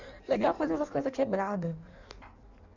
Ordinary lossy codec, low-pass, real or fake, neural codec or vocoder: none; 7.2 kHz; fake; codec, 16 kHz in and 24 kHz out, 1.1 kbps, FireRedTTS-2 codec